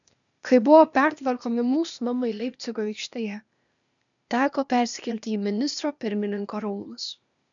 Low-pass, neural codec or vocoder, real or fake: 7.2 kHz; codec, 16 kHz, 0.8 kbps, ZipCodec; fake